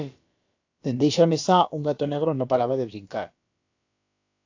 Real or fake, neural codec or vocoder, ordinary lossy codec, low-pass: fake; codec, 16 kHz, about 1 kbps, DyCAST, with the encoder's durations; AAC, 48 kbps; 7.2 kHz